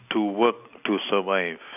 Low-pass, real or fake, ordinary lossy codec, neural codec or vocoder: 3.6 kHz; real; none; none